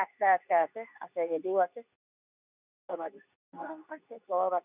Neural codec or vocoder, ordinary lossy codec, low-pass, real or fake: codec, 24 kHz, 0.9 kbps, WavTokenizer, medium speech release version 2; none; 3.6 kHz; fake